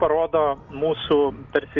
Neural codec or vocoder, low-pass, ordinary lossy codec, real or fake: none; 7.2 kHz; MP3, 64 kbps; real